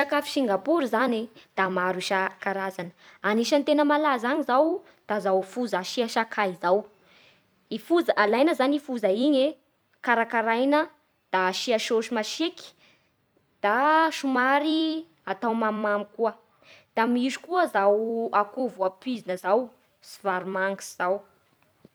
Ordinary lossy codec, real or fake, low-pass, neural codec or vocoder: none; fake; none; vocoder, 44.1 kHz, 128 mel bands every 256 samples, BigVGAN v2